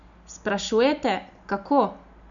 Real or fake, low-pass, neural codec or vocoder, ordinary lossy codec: real; 7.2 kHz; none; none